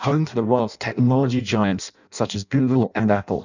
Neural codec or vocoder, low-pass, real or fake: codec, 16 kHz in and 24 kHz out, 0.6 kbps, FireRedTTS-2 codec; 7.2 kHz; fake